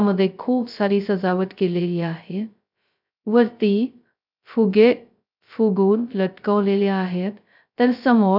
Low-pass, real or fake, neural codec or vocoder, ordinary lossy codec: 5.4 kHz; fake; codec, 16 kHz, 0.2 kbps, FocalCodec; none